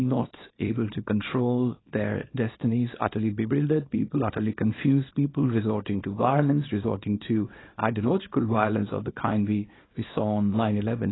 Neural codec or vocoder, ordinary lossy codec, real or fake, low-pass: codec, 24 kHz, 0.9 kbps, WavTokenizer, small release; AAC, 16 kbps; fake; 7.2 kHz